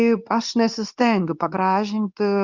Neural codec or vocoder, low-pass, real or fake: codec, 24 kHz, 0.9 kbps, WavTokenizer, medium speech release version 2; 7.2 kHz; fake